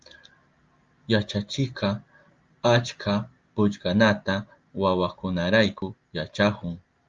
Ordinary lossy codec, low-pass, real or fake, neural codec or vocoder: Opus, 32 kbps; 7.2 kHz; real; none